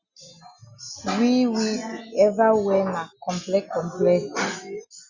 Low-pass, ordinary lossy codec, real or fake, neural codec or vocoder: 7.2 kHz; Opus, 64 kbps; real; none